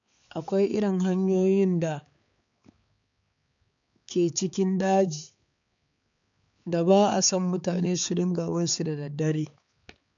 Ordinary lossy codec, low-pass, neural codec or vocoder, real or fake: none; 7.2 kHz; codec, 16 kHz, 4 kbps, X-Codec, HuBERT features, trained on balanced general audio; fake